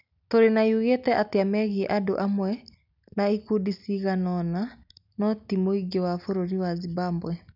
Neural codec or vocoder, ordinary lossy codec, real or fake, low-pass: none; none; real; 5.4 kHz